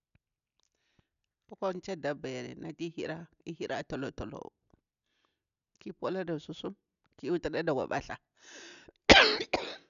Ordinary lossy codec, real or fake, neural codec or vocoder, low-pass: none; real; none; 7.2 kHz